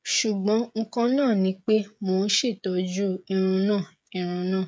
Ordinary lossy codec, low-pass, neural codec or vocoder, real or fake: none; none; codec, 16 kHz, 16 kbps, FreqCodec, smaller model; fake